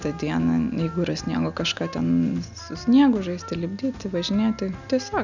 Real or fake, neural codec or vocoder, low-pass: real; none; 7.2 kHz